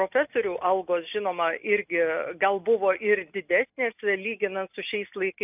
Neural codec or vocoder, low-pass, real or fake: none; 3.6 kHz; real